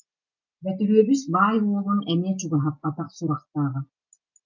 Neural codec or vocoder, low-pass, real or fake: none; 7.2 kHz; real